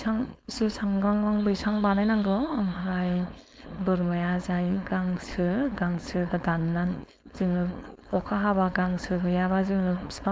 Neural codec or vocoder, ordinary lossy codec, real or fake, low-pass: codec, 16 kHz, 4.8 kbps, FACodec; none; fake; none